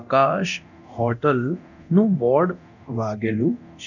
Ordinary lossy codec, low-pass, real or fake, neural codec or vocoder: none; 7.2 kHz; fake; codec, 24 kHz, 0.9 kbps, DualCodec